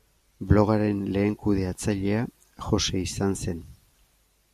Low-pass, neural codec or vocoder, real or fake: 14.4 kHz; none; real